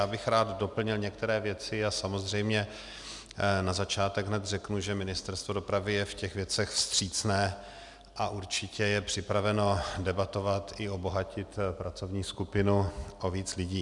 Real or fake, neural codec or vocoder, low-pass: real; none; 10.8 kHz